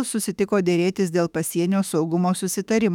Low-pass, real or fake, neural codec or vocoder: 19.8 kHz; fake; autoencoder, 48 kHz, 32 numbers a frame, DAC-VAE, trained on Japanese speech